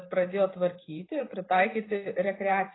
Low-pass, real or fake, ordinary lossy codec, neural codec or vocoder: 7.2 kHz; fake; AAC, 16 kbps; vocoder, 44.1 kHz, 128 mel bands every 512 samples, BigVGAN v2